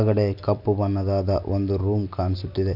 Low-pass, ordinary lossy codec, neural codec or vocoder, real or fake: 5.4 kHz; none; none; real